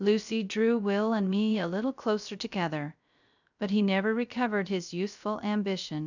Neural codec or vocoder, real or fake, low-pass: codec, 16 kHz, 0.3 kbps, FocalCodec; fake; 7.2 kHz